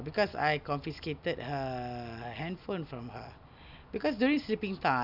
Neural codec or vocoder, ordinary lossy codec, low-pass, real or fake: none; none; 5.4 kHz; real